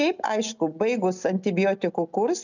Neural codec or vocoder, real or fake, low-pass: none; real; 7.2 kHz